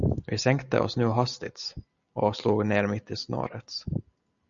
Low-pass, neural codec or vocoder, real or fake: 7.2 kHz; none; real